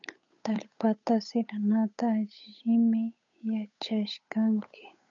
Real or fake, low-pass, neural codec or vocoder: fake; 7.2 kHz; codec, 16 kHz, 8 kbps, FunCodec, trained on Chinese and English, 25 frames a second